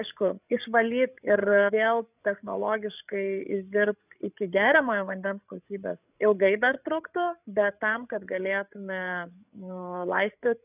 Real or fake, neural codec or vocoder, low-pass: fake; codec, 16 kHz, 8 kbps, FreqCodec, larger model; 3.6 kHz